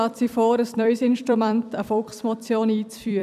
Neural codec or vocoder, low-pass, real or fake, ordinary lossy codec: vocoder, 44.1 kHz, 128 mel bands every 256 samples, BigVGAN v2; 14.4 kHz; fake; none